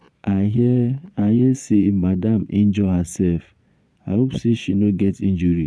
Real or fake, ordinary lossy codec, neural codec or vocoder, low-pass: fake; none; vocoder, 22.05 kHz, 80 mel bands, Vocos; none